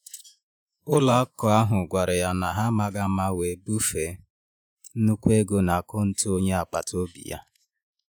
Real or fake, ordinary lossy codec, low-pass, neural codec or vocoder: fake; none; none; vocoder, 48 kHz, 128 mel bands, Vocos